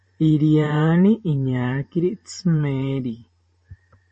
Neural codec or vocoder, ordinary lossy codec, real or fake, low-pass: vocoder, 44.1 kHz, 128 mel bands every 512 samples, BigVGAN v2; MP3, 32 kbps; fake; 10.8 kHz